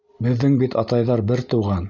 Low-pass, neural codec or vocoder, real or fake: 7.2 kHz; none; real